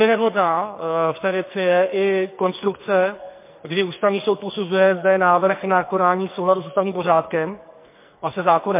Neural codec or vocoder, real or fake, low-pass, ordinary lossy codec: codec, 44.1 kHz, 2.6 kbps, SNAC; fake; 3.6 kHz; MP3, 24 kbps